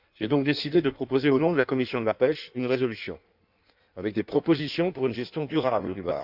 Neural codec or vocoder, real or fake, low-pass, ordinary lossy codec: codec, 16 kHz in and 24 kHz out, 1.1 kbps, FireRedTTS-2 codec; fake; 5.4 kHz; none